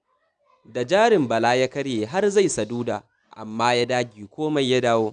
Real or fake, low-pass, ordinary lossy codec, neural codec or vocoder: real; 9.9 kHz; none; none